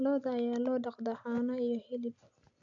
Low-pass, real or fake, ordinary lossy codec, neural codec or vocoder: 7.2 kHz; real; none; none